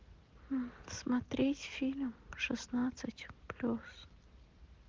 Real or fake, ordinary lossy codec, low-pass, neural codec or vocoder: real; Opus, 16 kbps; 7.2 kHz; none